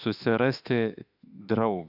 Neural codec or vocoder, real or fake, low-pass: autoencoder, 48 kHz, 32 numbers a frame, DAC-VAE, trained on Japanese speech; fake; 5.4 kHz